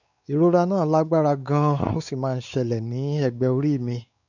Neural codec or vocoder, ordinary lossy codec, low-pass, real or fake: codec, 16 kHz, 4 kbps, X-Codec, WavLM features, trained on Multilingual LibriSpeech; none; 7.2 kHz; fake